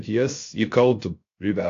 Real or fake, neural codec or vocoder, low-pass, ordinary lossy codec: fake; codec, 16 kHz, 0.3 kbps, FocalCodec; 7.2 kHz; AAC, 48 kbps